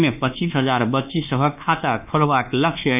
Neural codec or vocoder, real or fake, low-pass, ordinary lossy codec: codec, 24 kHz, 1.2 kbps, DualCodec; fake; 3.6 kHz; none